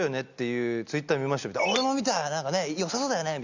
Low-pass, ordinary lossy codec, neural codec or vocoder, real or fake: 7.2 kHz; Opus, 64 kbps; none; real